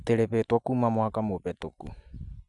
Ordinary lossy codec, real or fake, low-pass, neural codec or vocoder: none; real; 10.8 kHz; none